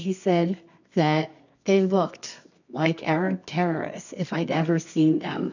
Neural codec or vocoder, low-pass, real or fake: codec, 24 kHz, 0.9 kbps, WavTokenizer, medium music audio release; 7.2 kHz; fake